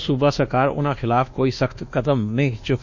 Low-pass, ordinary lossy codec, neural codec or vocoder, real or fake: 7.2 kHz; none; codec, 24 kHz, 1.2 kbps, DualCodec; fake